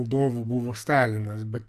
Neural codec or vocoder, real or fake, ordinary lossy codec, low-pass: codec, 44.1 kHz, 2.6 kbps, SNAC; fake; Opus, 64 kbps; 14.4 kHz